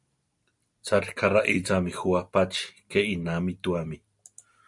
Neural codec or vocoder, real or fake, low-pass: none; real; 10.8 kHz